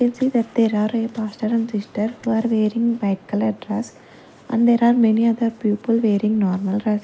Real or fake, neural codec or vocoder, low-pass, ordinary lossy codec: real; none; none; none